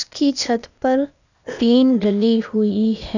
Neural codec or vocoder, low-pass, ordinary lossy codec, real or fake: codec, 16 kHz, 0.8 kbps, ZipCodec; 7.2 kHz; none; fake